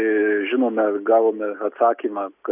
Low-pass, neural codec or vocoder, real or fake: 3.6 kHz; none; real